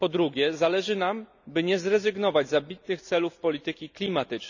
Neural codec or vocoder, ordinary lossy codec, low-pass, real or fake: none; none; 7.2 kHz; real